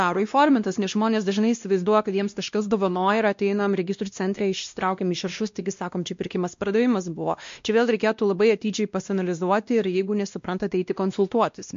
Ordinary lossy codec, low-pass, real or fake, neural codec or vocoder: MP3, 48 kbps; 7.2 kHz; fake; codec, 16 kHz, 1 kbps, X-Codec, WavLM features, trained on Multilingual LibriSpeech